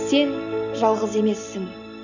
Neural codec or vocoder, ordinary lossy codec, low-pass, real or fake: none; none; 7.2 kHz; real